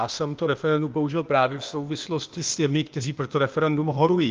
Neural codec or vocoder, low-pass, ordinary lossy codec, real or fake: codec, 16 kHz, 0.8 kbps, ZipCodec; 7.2 kHz; Opus, 32 kbps; fake